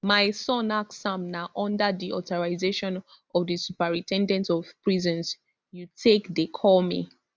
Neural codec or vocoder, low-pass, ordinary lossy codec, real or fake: none; none; none; real